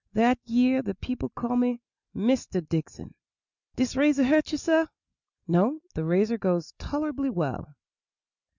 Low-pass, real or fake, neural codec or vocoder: 7.2 kHz; real; none